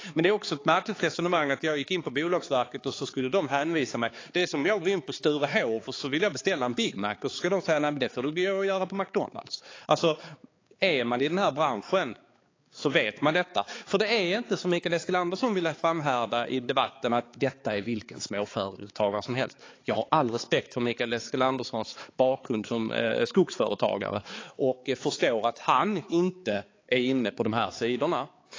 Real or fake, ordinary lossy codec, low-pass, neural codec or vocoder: fake; AAC, 32 kbps; 7.2 kHz; codec, 16 kHz, 4 kbps, X-Codec, HuBERT features, trained on balanced general audio